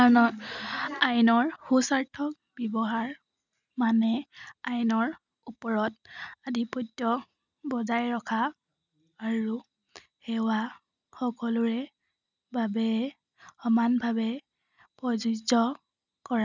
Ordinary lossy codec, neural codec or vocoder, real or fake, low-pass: none; none; real; 7.2 kHz